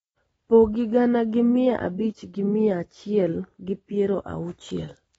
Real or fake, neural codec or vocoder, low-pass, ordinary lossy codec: real; none; 19.8 kHz; AAC, 24 kbps